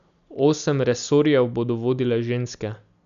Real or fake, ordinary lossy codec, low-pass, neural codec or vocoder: real; none; 7.2 kHz; none